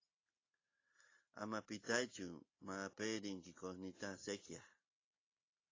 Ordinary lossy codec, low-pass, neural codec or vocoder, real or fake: AAC, 32 kbps; 7.2 kHz; none; real